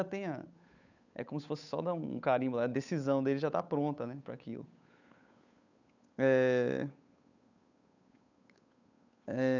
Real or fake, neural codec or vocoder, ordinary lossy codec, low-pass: fake; codec, 16 kHz, 8 kbps, FunCodec, trained on Chinese and English, 25 frames a second; none; 7.2 kHz